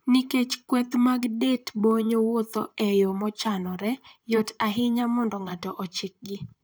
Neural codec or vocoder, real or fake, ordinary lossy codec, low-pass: vocoder, 44.1 kHz, 128 mel bands, Pupu-Vocoder; fake; none; none